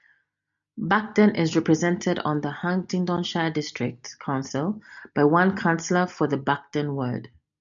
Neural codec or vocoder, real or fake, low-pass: none; real; 7.2 kHz